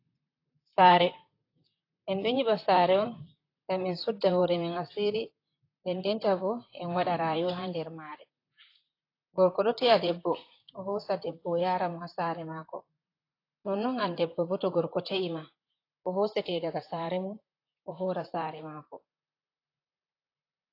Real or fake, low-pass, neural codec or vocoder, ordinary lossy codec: fake; 5.4 kHz; vocoder, 44.1 kHz, 128 mel bands, Pupu-Vocoder; AAC, 32 kbps